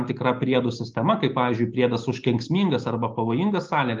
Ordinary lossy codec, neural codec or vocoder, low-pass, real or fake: Opus, 32 kbps; none; 7.2 kHz; real